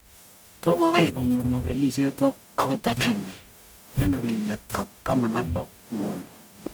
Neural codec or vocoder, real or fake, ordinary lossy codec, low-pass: codec, 44.1 kHz, 0.9 kbps, DAC; fake; none; none